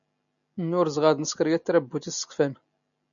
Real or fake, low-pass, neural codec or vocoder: real; 7.2 kHz; none